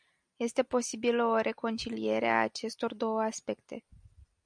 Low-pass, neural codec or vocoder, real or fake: 9.9 kHz; none; real